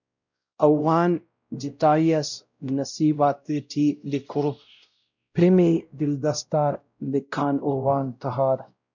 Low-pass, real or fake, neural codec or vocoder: 7.2 kHz; fake; codec, 16 kHz, 0.5 kbps, X-Codec, WavLM features, trained on Multilingual LibriSpeech